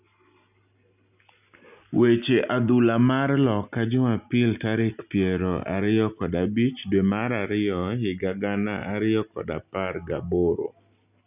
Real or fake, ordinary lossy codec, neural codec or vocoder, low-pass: real; none; none; 3.6 kHz